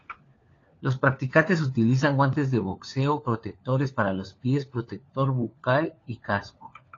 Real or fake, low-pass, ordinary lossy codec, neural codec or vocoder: fake; 7.2 kHz; AAC, 32 kbps; codec, 16 kHz, 4 kbps, FunCodec, trained on LibriTTS, 50 frames a second